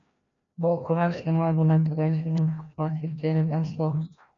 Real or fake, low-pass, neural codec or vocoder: fake; 7.2 kHz; codec, 16 kHz, 1 kbps, FreqCodec, larger model